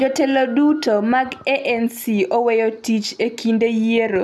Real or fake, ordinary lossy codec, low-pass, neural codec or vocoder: real; none; none; none